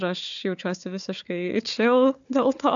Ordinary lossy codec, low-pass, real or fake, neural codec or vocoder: AAC, 64 kbps; 7.2 kHz; fake; codec, 16 kHz, 4 kbps, FunCodec, trained on Chinese and English, 50 frames a second